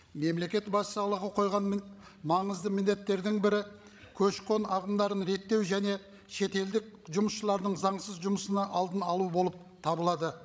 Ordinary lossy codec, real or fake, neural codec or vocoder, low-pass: none; fake; codec, 16 kHz, 16 kbps, FreqCodec, larger model; none